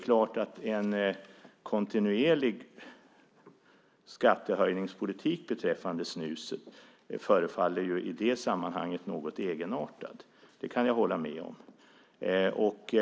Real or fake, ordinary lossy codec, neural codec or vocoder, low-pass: real; none; none; none